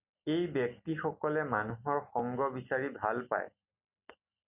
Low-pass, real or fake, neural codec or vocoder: 3.6 kHz; real; none